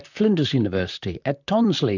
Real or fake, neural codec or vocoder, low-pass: fake; vocoder, 44.1 kHz, 128 mel bands, Pupu-Vocoder; 7.2 kHz